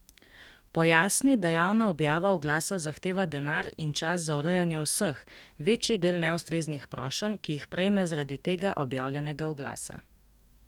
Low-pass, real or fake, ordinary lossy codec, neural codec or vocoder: 19.8 kHz; fake; none; codec, 44.1 kHz, 2.6 kbps, DAC